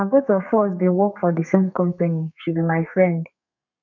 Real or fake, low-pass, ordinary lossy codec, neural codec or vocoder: fake; 7.2 kHz; none; codec, 32 kHz, 1.9 kbps, SNAC